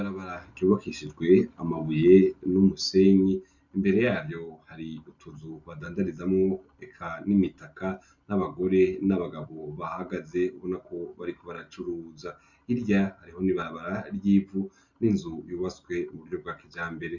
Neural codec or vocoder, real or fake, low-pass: none; real; 7.2 kHz